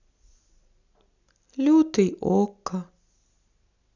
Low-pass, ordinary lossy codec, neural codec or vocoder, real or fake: 7.2 kHz; none; none; real